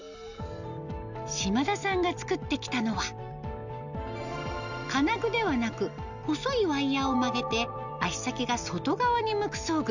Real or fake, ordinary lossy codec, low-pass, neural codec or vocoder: real; none; 7.2 kHz; none